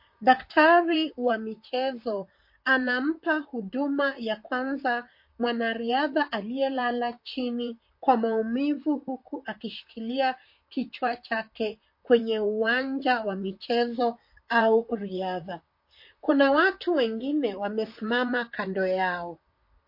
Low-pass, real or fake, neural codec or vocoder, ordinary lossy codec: 5.4 kHz; fake; vocoder, 44.1 kHz, 128 mel bands, Pupu-Vocoder; MP3, 32 kbps